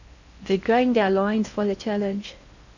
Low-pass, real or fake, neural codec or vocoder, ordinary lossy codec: 7.2 kHz; fake; codec, 16 kHz in and 24 kHz out, 0.8 kbps, FocalCodec, streaming, 65536 codes; none